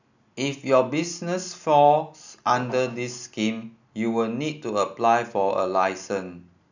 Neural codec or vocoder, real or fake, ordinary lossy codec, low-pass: none; real; none; 7.2 kHz